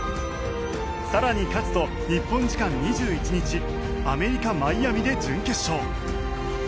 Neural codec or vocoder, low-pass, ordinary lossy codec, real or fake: none; none; none; real